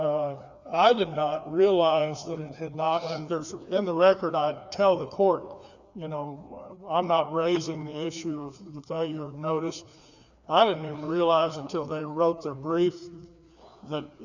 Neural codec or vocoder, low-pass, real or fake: codec, 16 kHz, 2 kbps, FreqCodec, larger model; 7.2 kHz; fake